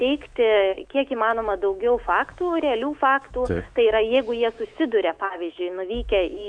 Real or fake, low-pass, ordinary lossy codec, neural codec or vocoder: fake; 9.9 kHz; MP3, 64 kbps; autoencoder, 48 kHz, 128 numbers a frame, DAC-VAE, trained on Japanese speech